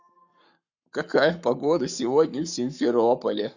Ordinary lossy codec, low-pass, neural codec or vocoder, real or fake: none; 7.2 kHz; codec, 44.1 kHz, 7.8 kbps, Pupu-Codec; fake